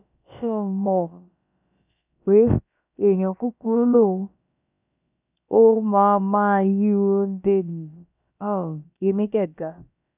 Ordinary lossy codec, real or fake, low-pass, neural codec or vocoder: none; fake; 3.6 kHz; codec, 16 kHz, about 1 kbps, DyCAST, with the encoder's durations